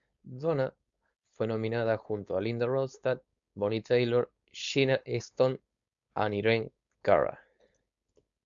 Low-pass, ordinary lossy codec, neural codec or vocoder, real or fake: 7.2 kHz; Opus, 64 kbps; codec, 16 kHz, 4.8 kbps, FACodec; fake